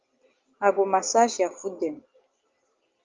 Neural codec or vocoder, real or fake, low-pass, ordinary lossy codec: none; real; 7.2 kHz; Opus, 32 kbps